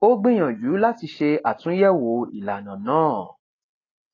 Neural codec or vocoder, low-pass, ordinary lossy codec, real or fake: none; 7.2 kHz; AAC, 32 kbps; real